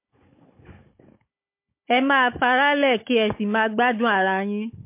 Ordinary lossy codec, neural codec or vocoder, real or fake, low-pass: MP3, 24 kbps; codec, 16 kHz, 16 kbps, FunCodec, trained on Chinese and English, 50 frames a second; fake; 3.6 kHz